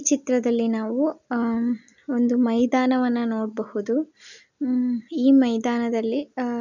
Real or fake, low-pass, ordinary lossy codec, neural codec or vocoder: real; 7.2 kHz; none; none